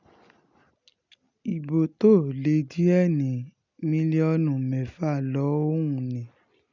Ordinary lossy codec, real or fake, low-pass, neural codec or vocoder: none; real; 7.2 kHz; none